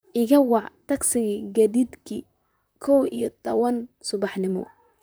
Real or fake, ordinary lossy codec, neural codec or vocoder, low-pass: fake; none; vocoder, 44.1 kHz, 128 mel bands, Pupu-Vocoder; none